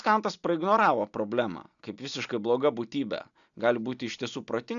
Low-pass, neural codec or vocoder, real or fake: 7.2 kHz; none; real